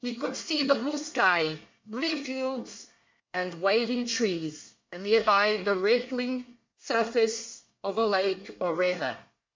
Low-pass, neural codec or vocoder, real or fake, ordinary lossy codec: 7.2 kHz; codec, 24 kHz, 1 kbps, SNAC; fake; MP3, 48 kbps